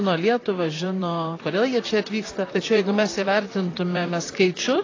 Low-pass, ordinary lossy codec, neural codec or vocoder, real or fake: 7.2 kHz; AAC, 32 kbps; vocoder, 44.1 kHz, 128 mel bands, Pupu-Vocoder; fake